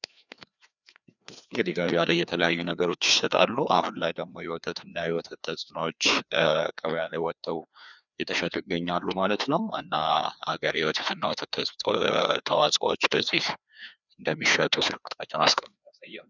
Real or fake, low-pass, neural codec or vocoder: fake; 7.2 kHz; codec, 16 kHz, 2 kbps, FreqCodec, larger model